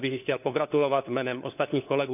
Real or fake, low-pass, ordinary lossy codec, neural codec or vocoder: fake; 3.6 kHz; none; codec, 16 kHz, 4 kbps, FunCodec, trained on LibriTTS, 50 frames a second